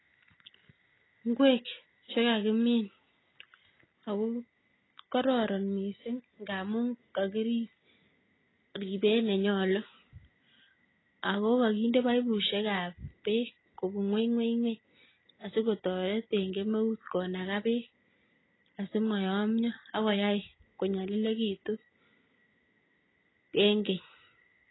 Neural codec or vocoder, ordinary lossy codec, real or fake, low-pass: none; AAC, 16 kbps; real; 7.2 kHz